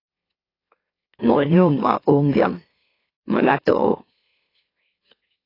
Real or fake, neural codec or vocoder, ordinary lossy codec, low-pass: fake; autoencoder, 44.1 kHz, a latent of 192 numbers a frame, MeloTTS; AAC, 24 kbps; 5.4 kHz